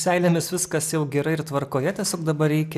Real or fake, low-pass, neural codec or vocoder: real; 14.4 kHz; none